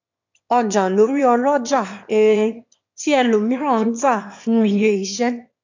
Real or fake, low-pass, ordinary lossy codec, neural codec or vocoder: fake; 7.2 kHz; AAC, 48 kbps; autoencoder, 22.05 kHz, a latent of 192 numbers a frame, VITS, trained on one speaker